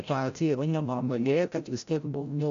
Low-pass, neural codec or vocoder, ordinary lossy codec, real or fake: 7.2 kHz; codec, 16 kHz, 0.5 kbps, FreqCodec, larger model; AAC, 64 kbps; fake